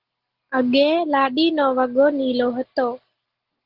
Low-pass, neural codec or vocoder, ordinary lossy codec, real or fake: 5.4 kHz; none; Opus, 16 kbps; real